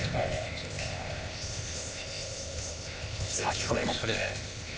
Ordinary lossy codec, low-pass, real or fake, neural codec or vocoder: none; none; fake; codec, 16 kHz, 0.8 kbps, ZipCodec